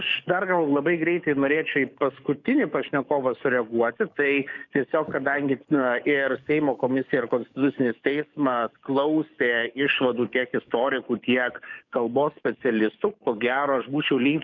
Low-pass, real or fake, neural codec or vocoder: 7.2 kHz; fake; codec, 44.1 kHz, 7.8 kbps, DAC